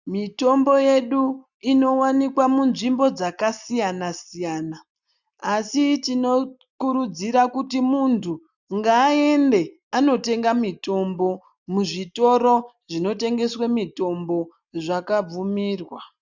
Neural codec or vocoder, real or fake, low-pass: none; real; 7.2 kHz